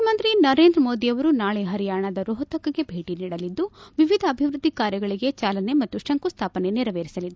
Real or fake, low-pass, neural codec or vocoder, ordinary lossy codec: real; none; none; none